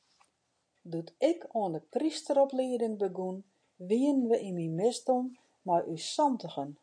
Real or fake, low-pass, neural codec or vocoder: real; 9.9 kHz; none